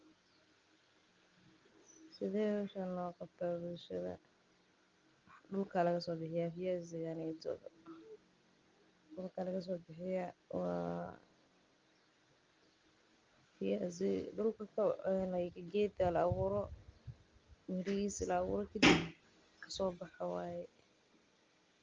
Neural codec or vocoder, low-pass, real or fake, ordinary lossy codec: none; 7.2 kHz; real; Opus, 32 kbps